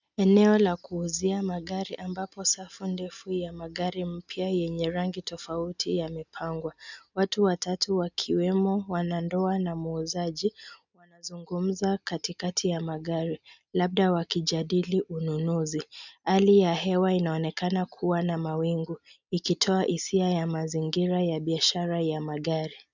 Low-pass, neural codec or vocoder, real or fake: 7.2 kHz; none; real